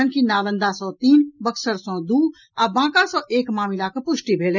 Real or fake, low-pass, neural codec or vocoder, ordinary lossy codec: real; 7.2 kHz; none; none